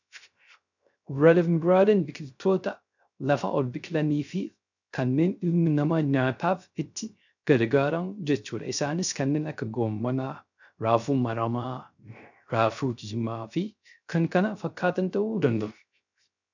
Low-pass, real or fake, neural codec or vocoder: 7.2 kHz; fake; codec, 16 kHz, 0.3 kbps, FocalCodec